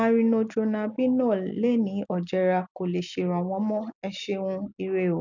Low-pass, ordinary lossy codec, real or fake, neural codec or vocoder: 7.2 kHz; none; real; none